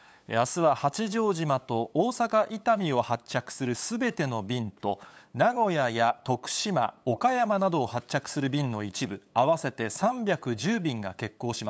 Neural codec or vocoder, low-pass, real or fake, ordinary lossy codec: codec, 16 kHz, 8 kbps, FunCodec, trained on LibriTTS, 25 frames a second; none; fake; none